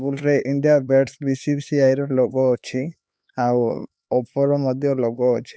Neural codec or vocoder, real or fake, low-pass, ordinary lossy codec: codec, 16 kHz, 4 kbps, X-Codec, HuBERT features, trained on LibriSpeech; fake; none; none